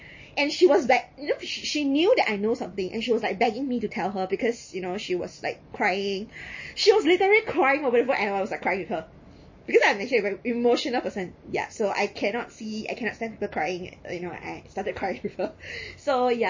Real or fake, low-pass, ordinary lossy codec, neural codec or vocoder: real; 7.2 kHz; MP3, 32 kbps; none